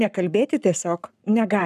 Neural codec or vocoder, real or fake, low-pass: codec, 44.1 kHz, 7.8 kbps, Pupu-Codec; fake; 14.4 kHz